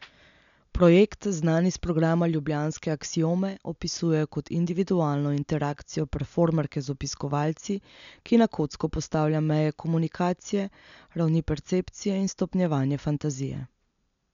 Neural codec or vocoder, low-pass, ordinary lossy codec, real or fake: none; 7.2 kHz; MP3, 96 kbps; real